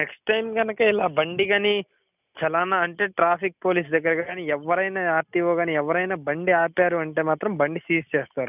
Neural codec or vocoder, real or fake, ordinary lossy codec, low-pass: none; real; none; 3.6 kHz